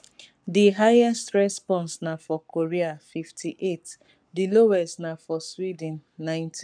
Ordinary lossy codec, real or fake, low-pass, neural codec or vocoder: none; fake; 9.9 kHz; codec, 44.1 kHz, 7.8 kbps, Pupu-Codec